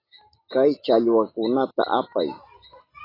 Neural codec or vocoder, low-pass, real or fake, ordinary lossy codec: none; 5.4 kHz; real; AAC, 24 kbps